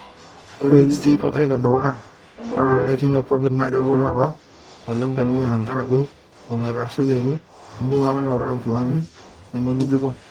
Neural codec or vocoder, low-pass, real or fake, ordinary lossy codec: codec, 44.1 kHz, 0.9 kbps, DAC; 19.8 kHz; fake; Opus, 32 kbps